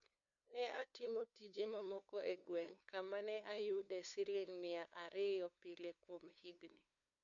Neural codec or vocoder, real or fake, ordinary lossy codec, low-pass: codec, 16 kHz, 2 kbps, FunCodec, trained on LibriTTS, 25 frames a second; fake; none; 7.2 kHz